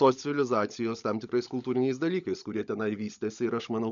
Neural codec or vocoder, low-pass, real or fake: codec, 16 kHz, 16 kbps, FunCodec, trained on LibriTTS, 50 frames a second; 7.2 kHz; fake